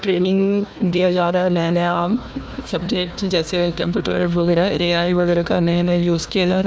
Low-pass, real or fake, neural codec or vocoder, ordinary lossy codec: none; fake; codec, 16 kHz, 1 kbps, FunCodec, trained on Chinese and English, 50 frames a second; none